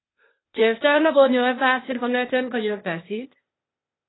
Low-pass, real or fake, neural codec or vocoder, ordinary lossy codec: 7.2 kHz; fake; codec, 16 kHz, 0.8 kbps, ZipCodec; AAC, 16 kbps